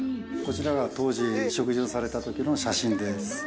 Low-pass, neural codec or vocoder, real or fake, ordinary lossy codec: none; none; real; none